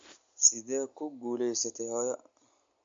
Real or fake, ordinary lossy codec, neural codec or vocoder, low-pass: real; MP3, 48 kbps; none; 7.2 kHz